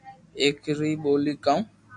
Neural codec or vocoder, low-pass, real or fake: none; 10.8 kHz; real